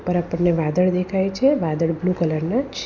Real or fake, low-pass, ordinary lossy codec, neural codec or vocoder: real; 7.2 kHz; none; none